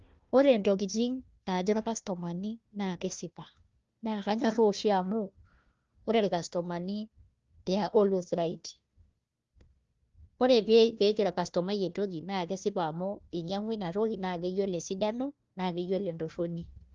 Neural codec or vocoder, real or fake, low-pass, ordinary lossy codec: codec, 16 kHz, 1 kbps, FunCodec, trained on Chinese and English, 50 frames a second; fake; 7.2 kHz; Opus, 32 kbps